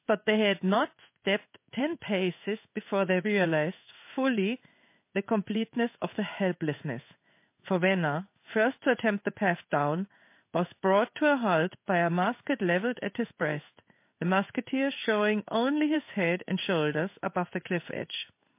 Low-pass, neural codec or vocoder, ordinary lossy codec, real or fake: 3.6 kHz; codec, 16 kHz in and 24 kHz out, 1 kbps, XY-Tokenizer; MP3, 24 kbps; fake